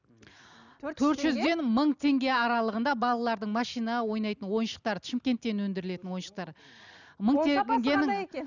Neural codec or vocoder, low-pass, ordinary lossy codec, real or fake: none; 7.2 kHz; none; real